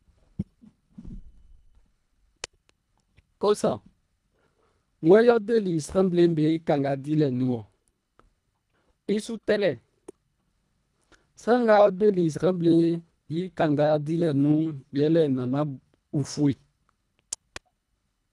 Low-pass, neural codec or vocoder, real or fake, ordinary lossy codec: none; codec, 24 kHz, 1.5 kbps, HILCodec; fake; none